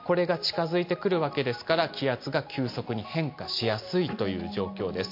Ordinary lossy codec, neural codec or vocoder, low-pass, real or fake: MP3, 32 kbps; none; 5.4 kHz; real